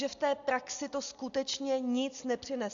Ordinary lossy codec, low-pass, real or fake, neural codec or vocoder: AAC, 64 kbps; 7.2 kHz; real; none